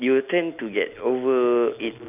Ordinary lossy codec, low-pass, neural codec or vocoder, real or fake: none; 3.6 kHz; none; real